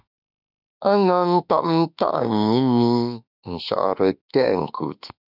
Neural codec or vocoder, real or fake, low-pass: autoencoder, 48 kHz, 32 numbers a frame, DAC-VAE, trained on Japanese speech; fake; 5.4 kHz